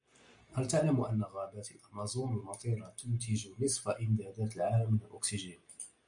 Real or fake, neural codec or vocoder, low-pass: real; none; 9.9 kHz